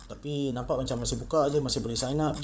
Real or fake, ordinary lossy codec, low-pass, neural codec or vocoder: fake; none; none; codec, 16 kHz, 8 kbps, FreqCodec, larger model